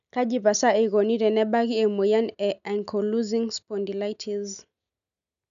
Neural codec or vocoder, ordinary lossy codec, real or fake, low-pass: none; none; real; 7.2 kHz